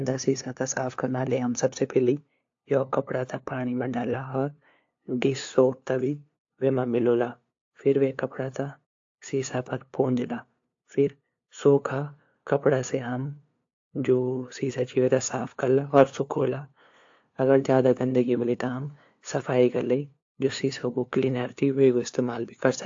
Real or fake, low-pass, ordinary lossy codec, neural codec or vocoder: fake; 7.2 kHz; AAC, 48 kbps; codec, 16 kHz, 2 kbps, FunCodec, trained on LibriTTS, 25 frames a second